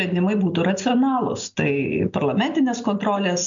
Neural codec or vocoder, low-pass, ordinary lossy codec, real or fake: none; 7.2 kHz; MP3, 64 kbps; real